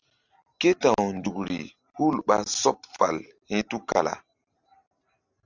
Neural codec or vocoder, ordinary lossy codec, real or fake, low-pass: none; Opus, 64 kbps; real; 7.2 kHz